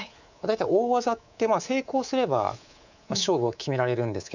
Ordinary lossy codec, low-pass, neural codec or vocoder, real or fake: none; 7.2 kHz; codec, 24 kHz, 3.1 kbps, DualCodec; fake